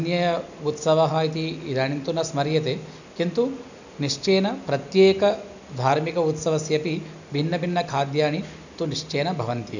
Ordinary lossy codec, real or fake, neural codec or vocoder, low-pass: none; real; none; 7.2 kHz